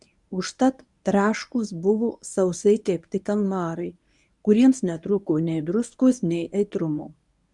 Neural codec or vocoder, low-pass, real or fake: codec, 24 kHz, 0.9 kbps, WavTokenizer, medium speech release version 1; 10.8 kHz; fake